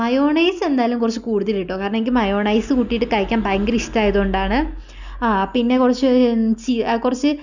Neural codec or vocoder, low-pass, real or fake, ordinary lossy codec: none; 7.2 kHz; real; none